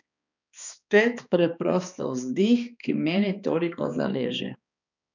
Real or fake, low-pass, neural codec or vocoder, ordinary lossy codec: fake; 7.2 kHz; codec, 16 kHz, 2 kbps, X-Codec, HuBERT features, trained on balanced general audio; none